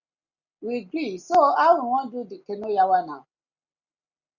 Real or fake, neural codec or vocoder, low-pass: real; none; 7.2 kHz